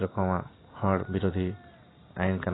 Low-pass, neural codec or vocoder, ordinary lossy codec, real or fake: 7.2 kHz; none; AAC, 16 kbps; real